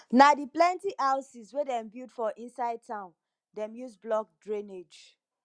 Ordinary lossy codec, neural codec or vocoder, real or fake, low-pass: Opus, 64 kbps; none; real; 9.9 kHz